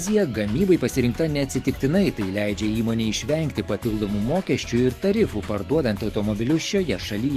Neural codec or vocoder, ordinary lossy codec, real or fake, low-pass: codec, 44.1 kHz, 7.8 kbps, DAC; Opus, 64 kbps; fake; 14.4 kHz